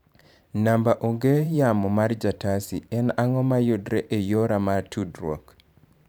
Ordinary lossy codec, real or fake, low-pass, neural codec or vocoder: none; fake; none; vocoder, 44.1 kHz, 128 mel bands every 512 samples, BigVGAN v2